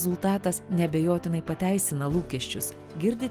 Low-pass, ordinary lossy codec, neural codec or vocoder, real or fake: 14.4 kHz; Opus, 24 kbps; none; real